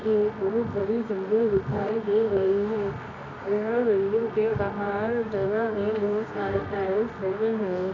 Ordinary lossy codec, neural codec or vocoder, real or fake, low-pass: none; codec, 24 kHz, 0.9 kbps, WavTokenizer, medium music audio release; fake; 7.2 kHz